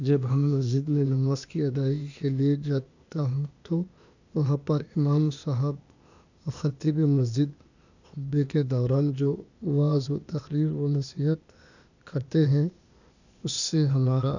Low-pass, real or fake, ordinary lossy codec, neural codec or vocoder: 7.2 kHz; fake; none; codec, 16 kHz, 0.8 kbps, ZipCodec